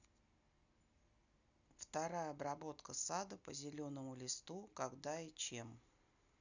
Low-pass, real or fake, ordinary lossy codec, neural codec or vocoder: 7.2 kHz; real; none; none